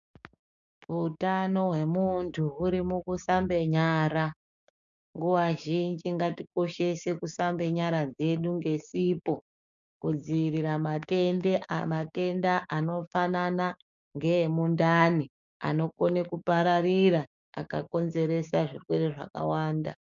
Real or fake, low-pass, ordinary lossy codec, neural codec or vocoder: fake; 7.2 kHz; MP3, 96 kbps; codec, 16 kHz, 6 kbps, DAC